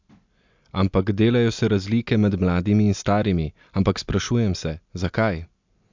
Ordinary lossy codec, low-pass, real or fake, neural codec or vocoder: MP3, 64 kbps; 7.2 kHz; real; none